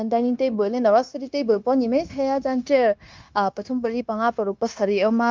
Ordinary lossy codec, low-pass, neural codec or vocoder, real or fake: Opus, 24 kbps; 7.2 kHz; codec, 16 kHz, 0.9 kbps, LongCat-Audio-Codec; fake